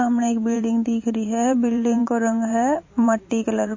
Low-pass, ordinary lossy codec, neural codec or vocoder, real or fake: 7.2 kHz; MP3, 32 kbps; vocoder, 44.1 kHz, 128 mel bands every 512 samples, BigVGAN v2; fake